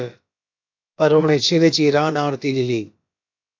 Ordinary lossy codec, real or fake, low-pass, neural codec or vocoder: AAC, 48 kbps; fake; 7.2 kHz; codec, 16 kHz, about 1 kbps, DyCAST, with the encoder's durations